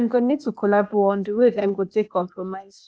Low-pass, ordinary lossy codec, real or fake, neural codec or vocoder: none; none; fake; codec, 16 kHz, 0.8 kbps, ZipCodec